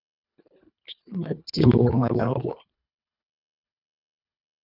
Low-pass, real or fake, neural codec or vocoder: 5.4 kHz; fake; codec, 24 kHz, 1.5 kbps, HILCodec